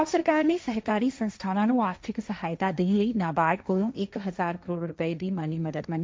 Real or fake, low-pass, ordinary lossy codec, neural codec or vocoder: fake; none; none; codec, 16 kHz, 1.1 kbps, Voila-Tokenizer